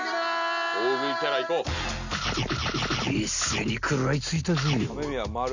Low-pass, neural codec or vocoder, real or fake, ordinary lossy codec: 7.2 kHz; none; real; none